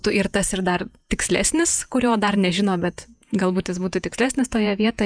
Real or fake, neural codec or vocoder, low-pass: fake; vocoder, 48 kHz, 128 mel bands, Vocos; 9.9 kHz